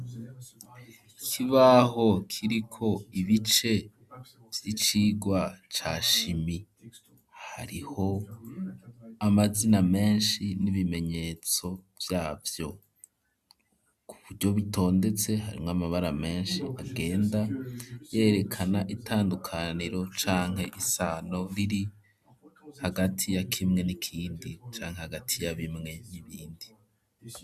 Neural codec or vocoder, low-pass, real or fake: none; 14.4 kHz; real